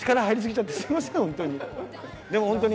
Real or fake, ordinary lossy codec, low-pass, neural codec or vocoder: real; none; none; none